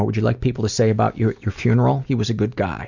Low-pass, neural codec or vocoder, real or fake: 7.2 kHz; none; real